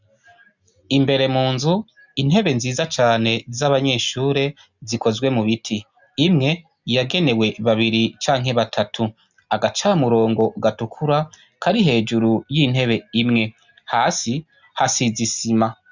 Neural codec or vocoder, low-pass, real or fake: none; 7.2 kHz; real